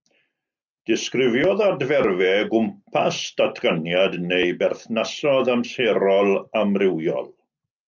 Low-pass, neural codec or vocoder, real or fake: 7.2 kHz; none; real